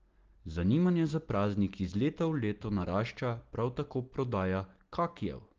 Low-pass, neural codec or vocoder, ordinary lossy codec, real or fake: 7.2 kHz; none; Opus, 16 kbps; real